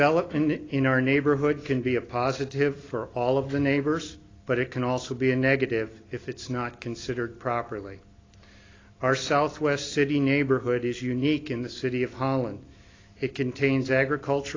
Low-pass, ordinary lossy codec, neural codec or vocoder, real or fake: 7.2 kHz; AAC, 32 kbps; none; real